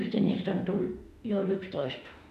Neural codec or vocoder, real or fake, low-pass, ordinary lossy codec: autoencoder, 48 kHz, 32 numbers a frame, DAC-VAE, trained on Japanese speech; fake; 14.4 kHz; none